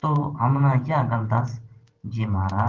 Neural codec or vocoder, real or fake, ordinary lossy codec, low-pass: vocoder, 44.1 kHz, 128 mel bands every 512 samples, BigVGAN v2; fake; Opus, 16 kbps; 7.2 kHz